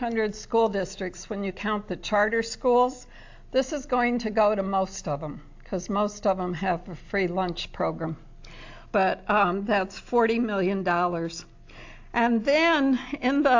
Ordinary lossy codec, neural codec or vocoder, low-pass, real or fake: AAC, 48 kbps; none; 7.2 kHz; real